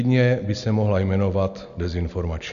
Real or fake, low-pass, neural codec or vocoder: real; 7.2 kHz; none